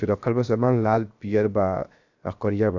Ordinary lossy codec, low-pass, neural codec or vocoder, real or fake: AAC, 48 kbps; 7.2 kHz; codec, 16 kHz, 0.7 kbps, FocalCodec; fake